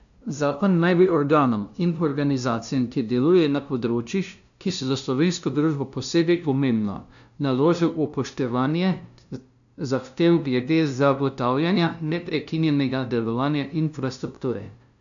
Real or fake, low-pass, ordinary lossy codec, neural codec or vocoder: fake; 7.2 kHz; none; codec, 16 kHz, 0.5 kbps, FunCodec, trained on LibriTTS, 25 frames a second